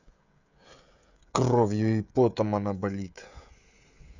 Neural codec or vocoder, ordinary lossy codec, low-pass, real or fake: codec, 16 kHz, 16 kbps, FreqCodec, smaller model; none; 7.2 kHz; fake